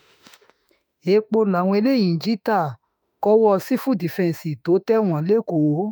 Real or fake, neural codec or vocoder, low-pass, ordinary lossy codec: fake; autoencoder, 48 kHz, 32 numbers a frame, DAC-VAE, trained on Japanese speech; none; none